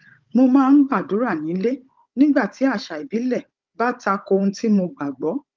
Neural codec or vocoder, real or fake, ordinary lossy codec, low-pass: codec, 16 kHz, 16 kbps, FunCodec, trained on Chinese and English, 50 frames a second; fake; Opus, 32 kbps; 7.2 kHz